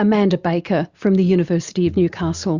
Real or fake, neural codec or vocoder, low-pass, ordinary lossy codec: real; none; 7.2 kHz; Opus, 64 kbps